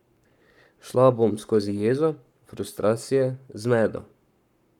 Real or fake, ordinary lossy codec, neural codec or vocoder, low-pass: fake; none; codec, 44.1 kHz, 7.8 kbps, Pupu-Codec; 19.8 kHz